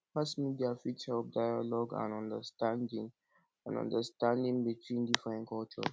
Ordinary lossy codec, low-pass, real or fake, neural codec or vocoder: none; none; real; none